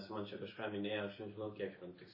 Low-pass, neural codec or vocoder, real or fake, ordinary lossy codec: 5.4 kHz; none; real; MP3, 24 kbps